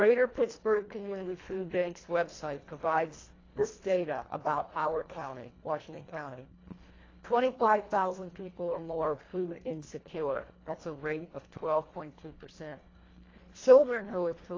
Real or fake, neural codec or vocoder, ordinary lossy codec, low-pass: fake; codec, 24 kHz, 1.5 kbps, HILCodec; AAC, 32 kbps; 7.2 kHz